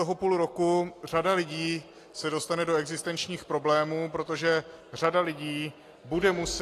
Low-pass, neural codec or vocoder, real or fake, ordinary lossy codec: 14.4 kHz; none; real; AAC, 48 kbps